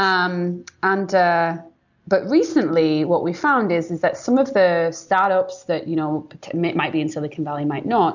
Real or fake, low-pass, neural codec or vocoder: real; 7.2 kHz; none